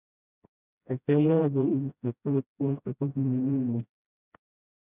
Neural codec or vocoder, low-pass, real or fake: codec, 16 kHz, 0.5 kbps, FreqCodec, smaller model; 3.6 kHz; fake